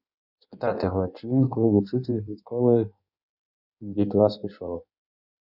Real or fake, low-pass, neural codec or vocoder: fake; 5.4 kHz; codec, 16 kHz in and 24 kHz out, 1.1 kbps, FireRedTTS-2 codec